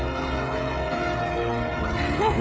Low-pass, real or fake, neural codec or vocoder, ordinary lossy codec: none; fake; codec, 16 kHz, 16 kbps, FreqCodec, smaller model; none